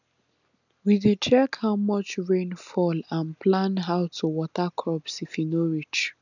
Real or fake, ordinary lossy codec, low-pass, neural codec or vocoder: real; none; 7.2 kHz; none